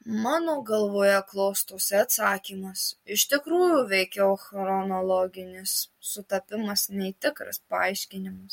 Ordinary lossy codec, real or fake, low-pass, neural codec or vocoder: MP3, 64 kbps; real; 19.8 kHz; none